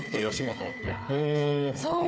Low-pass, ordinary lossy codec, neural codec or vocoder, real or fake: none; none; codec, 16 kHz, 4 kbps, FunCodec, trained on Chinese and English, 50 frames a second; fake